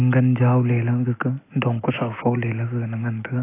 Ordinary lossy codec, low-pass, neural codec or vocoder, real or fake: AAC, 24 kbps; 3.6 kHz; none; real